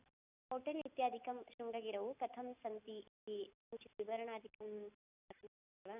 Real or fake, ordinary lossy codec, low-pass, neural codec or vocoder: real; none; 3.6 kHz; none